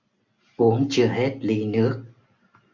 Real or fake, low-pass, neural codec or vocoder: real; 7.2 kHz; none